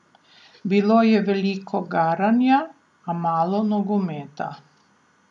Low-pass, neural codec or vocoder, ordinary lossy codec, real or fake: 10.8 kHz; none; none; real